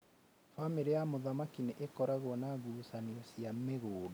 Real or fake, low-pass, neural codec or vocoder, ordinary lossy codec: real; none; none; none